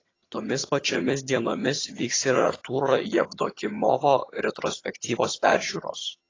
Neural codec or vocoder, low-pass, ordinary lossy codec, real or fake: vocoder, 22.05 kHz, 80 mel bands, HiFi-GAN; 7.2 kHz; AAC, 32 kbps; fake